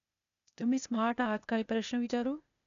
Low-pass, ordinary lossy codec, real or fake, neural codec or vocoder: 7.2 kHz; none; fake; codec, 16 kHz, 0.8 kbps, ZipCodec